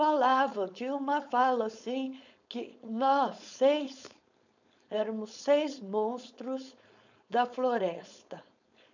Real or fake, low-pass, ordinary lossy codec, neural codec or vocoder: fake; 7.2 kHz; none; codec, 16 kHz, 4.8 kbps, FACodec